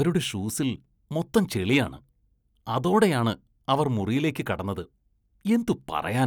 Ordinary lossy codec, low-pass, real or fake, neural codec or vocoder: none; none; real; none